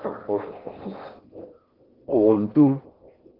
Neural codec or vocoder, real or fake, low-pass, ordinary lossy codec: codec, 16 kHz in and 24 kHz out, 0.6 kbps, FocalCodec, streaming, 4096 codes; fake; 5.4 kHz; Opus, 16 kbps